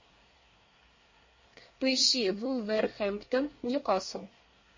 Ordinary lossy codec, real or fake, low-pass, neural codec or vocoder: MP3, 32 kbps; fake; 7.2 kHz; codec, 24 kHz, 1 kbps, SNAC